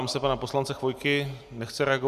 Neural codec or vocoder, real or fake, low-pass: none; real; 14.4 kHz